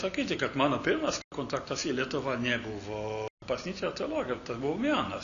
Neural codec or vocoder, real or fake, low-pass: none; real; 7.2 kHz